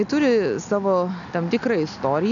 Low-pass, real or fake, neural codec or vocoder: 7.2 kHz; real; none